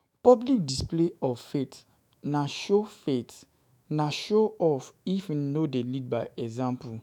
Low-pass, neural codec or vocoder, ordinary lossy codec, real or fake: 19.8 kHz; autoencoder, 48 kHz, 128 numbers a frame, DAC-VAE, trained on Japanese speech; none; fake